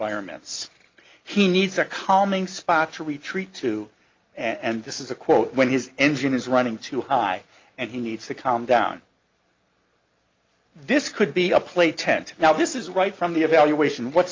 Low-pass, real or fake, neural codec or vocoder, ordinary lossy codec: 7.2 kHz; real; none; Opus, 24 kbps